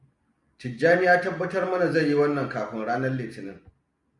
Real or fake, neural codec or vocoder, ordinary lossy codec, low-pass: real; none; MP3, 96 kbps; 10.8 kHz